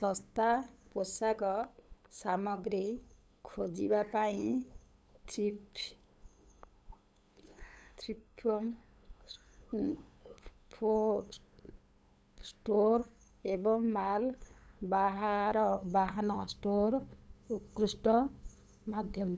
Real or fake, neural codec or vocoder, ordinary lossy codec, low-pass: fake; codec, 16 kHz, 8 kbps, FunCodec, trained on LibriTTS, 25 frames a second; none; none